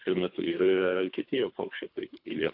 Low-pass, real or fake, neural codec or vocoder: 5.4 kHz; fake; codec, 24 kHz, 3 kbps, HILCodec